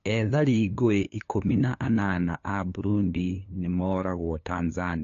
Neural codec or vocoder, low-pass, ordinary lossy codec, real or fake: codec, 16 kHz, 2 kbps, FunCodec, trained on LibriTTS, 25 frames a second; 7.2 kHz; AAC, 48 kbps; fake